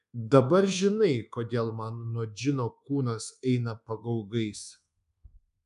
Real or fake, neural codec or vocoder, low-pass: fake; codec, 24 kHz, 1.2 kbps, DualCodec; 10.8 kHz